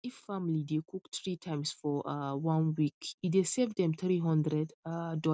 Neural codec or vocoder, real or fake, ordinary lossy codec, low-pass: none; real; none; none